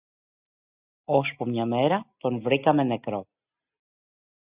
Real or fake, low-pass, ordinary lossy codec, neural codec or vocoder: real; 3.6 kHz; Opus, 64 kbps; none